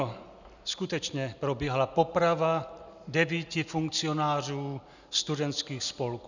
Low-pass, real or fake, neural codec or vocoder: 7.2 kHz; real; none